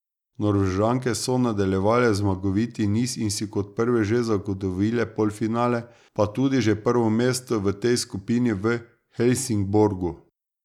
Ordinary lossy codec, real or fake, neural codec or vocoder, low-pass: none; real; none; 19.8 kHz